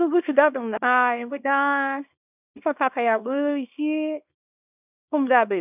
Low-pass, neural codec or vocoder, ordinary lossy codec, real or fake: 3.6 kHz; codec, 24 kHz, 0.9 kbps, WavTokenizer, small release; none; fake